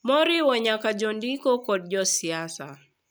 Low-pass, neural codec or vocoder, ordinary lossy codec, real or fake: none; none; none; real